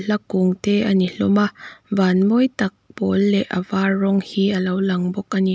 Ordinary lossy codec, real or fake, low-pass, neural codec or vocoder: none; real; none; none